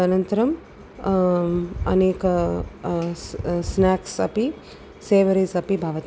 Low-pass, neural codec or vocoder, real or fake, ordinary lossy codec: none; none; real; none